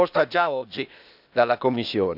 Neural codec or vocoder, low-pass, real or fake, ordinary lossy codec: codec, 16 kHz, 0.8 kbps, ZipCodec; 5.4 kHz; fake; none